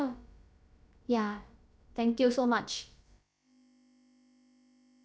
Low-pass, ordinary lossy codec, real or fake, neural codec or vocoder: none; none; fake; codec, 16 kHz, about 1 kbps, DyCAST, with the encoder's durations